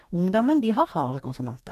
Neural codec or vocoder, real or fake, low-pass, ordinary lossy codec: codec, 44.1 kHz, 2.6 kbps, DAC; fake; 14.4 kHz; none